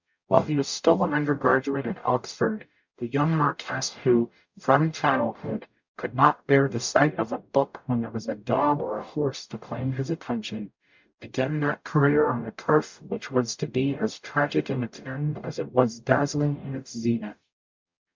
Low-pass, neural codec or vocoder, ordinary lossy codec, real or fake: 7.2 kHz; codec, 44.1 kHz, 0.9 kbps, DAC; MP3, 64 kbps; fake